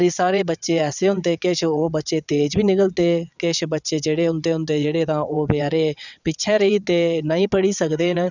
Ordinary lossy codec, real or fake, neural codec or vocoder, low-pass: none; fake; vocoder, 22.05 kHz, 80 mel bands, WaveNeXt; 7.2 kHz